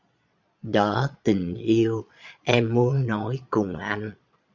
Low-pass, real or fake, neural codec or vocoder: 7.2 kHz; fake; vocoder, 22.05 kHz, 80 mel bands, Vocos